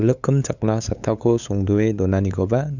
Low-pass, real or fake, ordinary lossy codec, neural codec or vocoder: 7.2 kHz; fake; none; codec, 16 kHz, 8 kbps, FunCodec, trained on LibriTTS, 25 frames a second